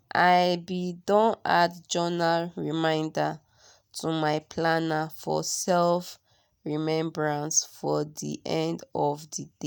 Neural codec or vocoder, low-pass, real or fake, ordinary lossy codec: none; none; real; none